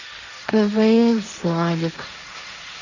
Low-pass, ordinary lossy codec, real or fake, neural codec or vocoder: 7.2 kHz; none; fake; codec, 16 kHz, 1.1 kbps, Voila-Tokenizer